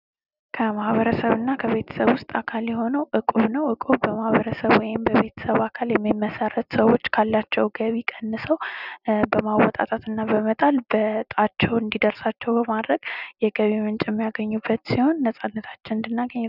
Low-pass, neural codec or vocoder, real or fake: 5.4 kHz; none; real